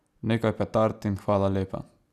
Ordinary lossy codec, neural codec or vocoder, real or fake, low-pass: none; none; real; 14.4 kHz